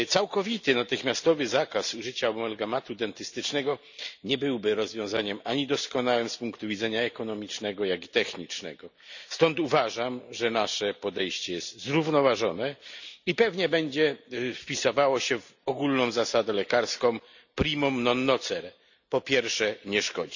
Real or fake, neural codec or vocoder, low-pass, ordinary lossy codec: real; none; 7.2 kHz; none